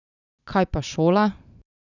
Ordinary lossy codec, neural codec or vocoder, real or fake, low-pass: none; none; real; 7.2 kHz